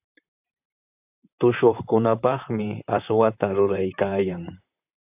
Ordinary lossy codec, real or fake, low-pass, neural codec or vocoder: AAC, 32 kbps; fake; 3.6 kHz; vocoder, 44.1 kHz, 128 mel bands, Pupu-Vocoder